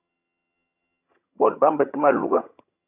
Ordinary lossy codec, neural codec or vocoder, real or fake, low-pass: AAC, 24 kbps; vocoder, 22.05 kHz, 80 mel bands, HiFi-GAN; fake; 3.6 kHz